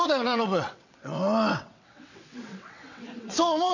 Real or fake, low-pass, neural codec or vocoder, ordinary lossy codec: fake; 7.2 kHz; vocoder, 22.05 kHz, 80 mel bands, WaveNeXt; none